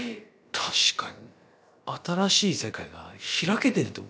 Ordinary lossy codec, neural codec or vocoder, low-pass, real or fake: none; codec, 16 kHz, about 1 kbps, DyCAST, with the encoder's durations; none; fake